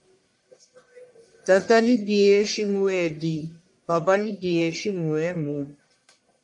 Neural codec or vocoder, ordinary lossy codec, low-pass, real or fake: codec, 44.1 kHz, 1.7 kbps, Pupu-Codec; AAC, 64 kbps; 10.8 kHz; fake